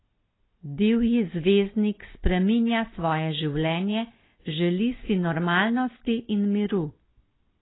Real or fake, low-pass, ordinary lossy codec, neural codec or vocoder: real; 7.2 kHz; AAC, 16 kbps; none